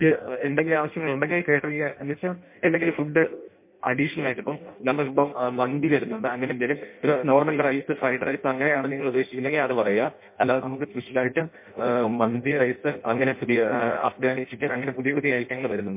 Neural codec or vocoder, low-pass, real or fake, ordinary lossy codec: codec, 16 kHz in and 24 kHz out, 0.6 kbps, FireRedTTS-2 codec; 3.6 kHz; fake; MP3, 24 kbps